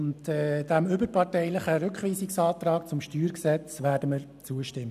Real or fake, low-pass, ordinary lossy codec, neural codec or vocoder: real; 14.4 kHz; none; none